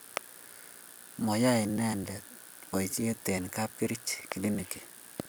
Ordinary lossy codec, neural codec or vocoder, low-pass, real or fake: none; vocoder, 44.1 kHz, 128 mel bands every 256 samples, BigVGAN v2; none; fake